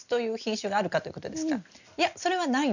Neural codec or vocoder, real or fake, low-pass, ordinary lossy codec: none; real; 7.2 kHz; none